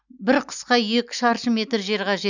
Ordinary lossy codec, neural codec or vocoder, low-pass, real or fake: none; none; 7.2 kHz; real